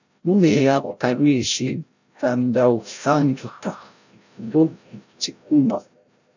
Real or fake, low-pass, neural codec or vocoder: fake; 7.2 kHz; codec, 16 kHz, 0.5 kbps, FreqCodec, larger model